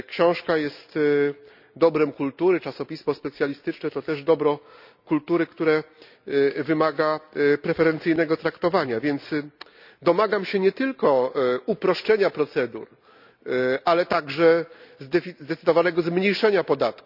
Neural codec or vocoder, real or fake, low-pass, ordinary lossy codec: none; real; 5.4 kHz; none